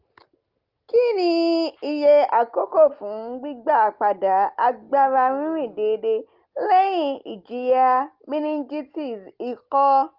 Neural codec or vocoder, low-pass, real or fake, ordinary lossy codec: none; 5.4 kHz; real; Opus, 32 kbps